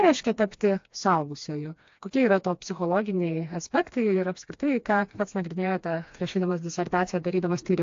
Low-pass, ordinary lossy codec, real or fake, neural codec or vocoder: 7.2 kHz; AAC, 48 kbps; fake; codec, 16 kHz, 2 kbps, FreqCodec, smaller model